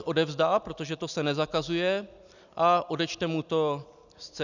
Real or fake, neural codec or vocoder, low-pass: real; none; 7.2 kHz